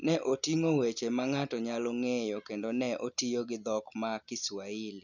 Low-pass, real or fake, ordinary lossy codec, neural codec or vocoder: 7.2 kHz; real; none; none